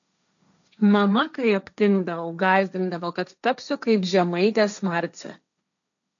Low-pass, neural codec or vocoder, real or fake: 7.2 kHz; codec, 16 kHz, 1.1 kbps, Voila-Tokenizer; fake